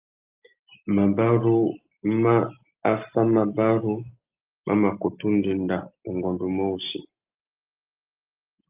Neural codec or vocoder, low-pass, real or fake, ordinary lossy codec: none; 3.6 kHz; real; Opus, 24 kbps